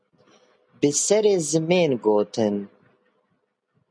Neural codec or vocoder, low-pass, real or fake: none; 9.9 kHz; real